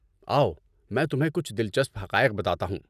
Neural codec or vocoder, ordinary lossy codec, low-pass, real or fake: none; none; 14.4 kHz; real